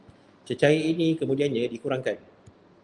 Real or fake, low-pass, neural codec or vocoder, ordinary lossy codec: real; 10.8 kHz; none; Opus, 32 kbps